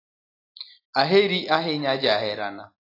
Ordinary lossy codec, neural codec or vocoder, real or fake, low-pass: AAC, 24 kbps; none; real; 5.4 kHz